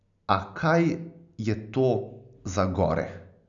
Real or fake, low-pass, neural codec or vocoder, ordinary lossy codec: real; 7.2 kHz; none; none